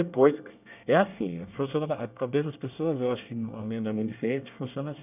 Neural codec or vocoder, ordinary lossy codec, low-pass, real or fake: codec, 24 kHz, 1 kbps, SNAC; none; 3.6 kHz; fake